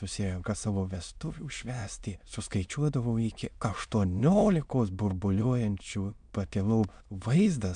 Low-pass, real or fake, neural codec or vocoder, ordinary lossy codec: 9.9 kHz; fake; autoencoder, 22.05 kHz, a latent of 192 numbers a frame, VITS, trained on many speakers; MP3, 96 kbps